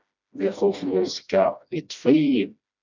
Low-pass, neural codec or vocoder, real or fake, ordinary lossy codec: 7.2 kHz; codec, 16 kHz, 1 kbps, FreqCodec, smaller model; fake; MP3, 64 kbps